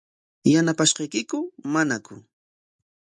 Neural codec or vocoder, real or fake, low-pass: none; real; 10.8 kHz